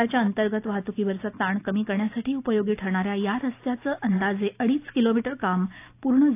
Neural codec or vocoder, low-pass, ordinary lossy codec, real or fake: none; 3.6 kHz; AAC, 24 kbps; real